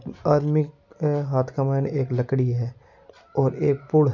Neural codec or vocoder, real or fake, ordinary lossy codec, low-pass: none; real; none; 7.2 kHz